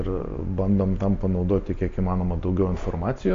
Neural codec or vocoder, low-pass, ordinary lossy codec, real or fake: none; 7.2 kHz; MP3, 48 kbps; real